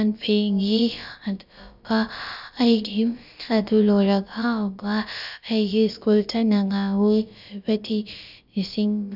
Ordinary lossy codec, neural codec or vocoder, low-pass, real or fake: none; codec, 16 kHz, about 1 kbps, DyCAST, with the encoder's durations; 5.4 kHz; fake